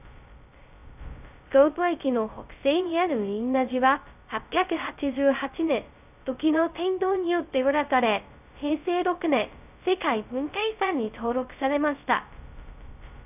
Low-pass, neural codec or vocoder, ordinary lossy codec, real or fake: 3.6 kHz; codec, 16 kHz, 0.2 kbps, FocalCodec; none; fake